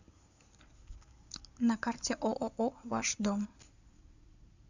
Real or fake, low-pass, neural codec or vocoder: fake; 7.2 kHz; codec, 16 kHz in and 24 kHz out, 2.2 kbps, FireRedTTS-2 codec